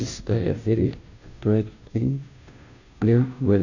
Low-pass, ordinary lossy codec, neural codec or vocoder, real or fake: 7.2 kHz; none; codec, 16 kHz, 0.5 kbps, FunCodec, trained on Chinese and English, 25 frames a second; fake